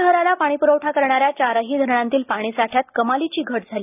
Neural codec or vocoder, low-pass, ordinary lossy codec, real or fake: none; 3.6 kHz; none; real